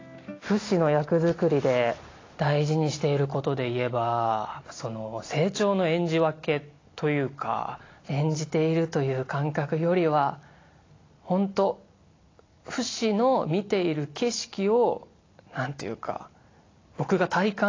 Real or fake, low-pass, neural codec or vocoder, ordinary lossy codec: real; 7.2 kHz; none; AAC, 32 kbps